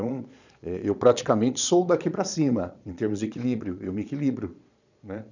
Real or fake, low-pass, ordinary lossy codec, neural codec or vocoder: fake; 7.2 kHz; none; vocoder, 22.05 kHz, 80 mel bands, Vocos